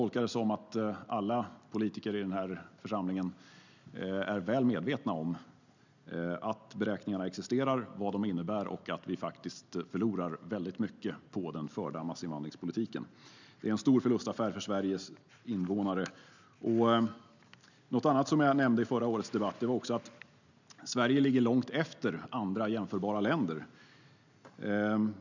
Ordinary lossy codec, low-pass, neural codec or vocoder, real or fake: none; 7.2 kHz; none; real